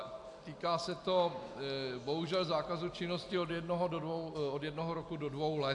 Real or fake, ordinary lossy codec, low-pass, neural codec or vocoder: real; AAC, 64 kbps; 10.8 kHz; none